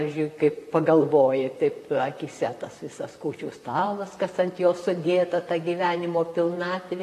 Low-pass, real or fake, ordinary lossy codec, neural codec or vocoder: 14.4 kHz; fake; AAC, 48 kbps; vocoder, 44.1 kHz, 128 mel bands, Pupu-Vocoder